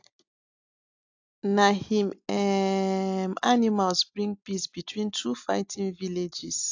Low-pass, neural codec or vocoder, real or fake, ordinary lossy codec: 7.2 kHz; none; real; AAC, 48 kbps